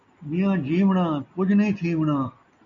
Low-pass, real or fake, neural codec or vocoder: 7.2 kHz; real; none